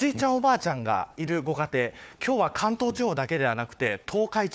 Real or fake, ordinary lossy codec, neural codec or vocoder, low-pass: fake; none; codec, 16 kHz, 4 kbps, FunCodec, trained on Chinese and English, 50 frames a second; none